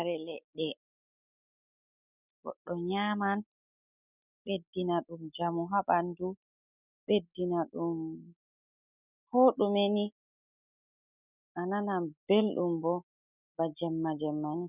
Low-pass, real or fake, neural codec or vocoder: 3.6 kHz; real; none